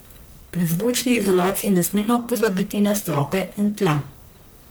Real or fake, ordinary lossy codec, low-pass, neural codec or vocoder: fake; none; none; codec, 44.1 kHz, 1.7 kbps, Pupu-Codec